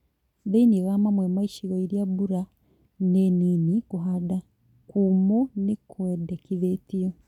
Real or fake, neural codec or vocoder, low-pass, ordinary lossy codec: real; none; 19.8 kHz; none